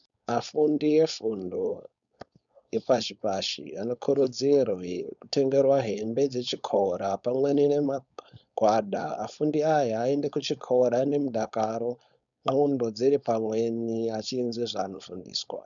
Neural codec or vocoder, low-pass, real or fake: codec, 16 kHz, 4.8 kbps, FACodec; 7.2 kHz; fake